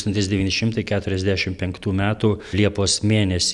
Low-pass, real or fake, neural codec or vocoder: 10.8 kHz; real; none